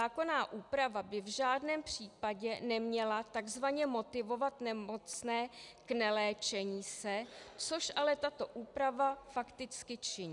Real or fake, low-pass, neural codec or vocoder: real; 10.8 kHz; none